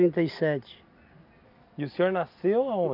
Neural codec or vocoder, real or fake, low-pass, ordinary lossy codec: none; real; 5.4 kHz; none